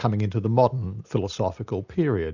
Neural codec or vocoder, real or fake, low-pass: none; real; 7.2 kHz